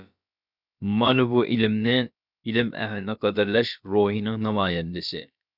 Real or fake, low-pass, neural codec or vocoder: fake; 5.4 kHz; codec, 16 kHz, about 1 kbps, DyCAST, with the encoder's durations